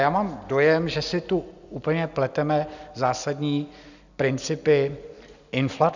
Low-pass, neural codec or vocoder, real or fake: 7.2 kHz; none; real